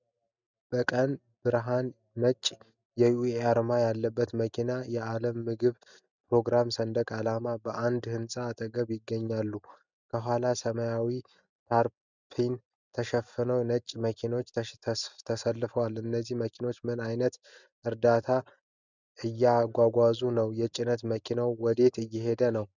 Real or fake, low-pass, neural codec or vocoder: real; 7.2 kHz; none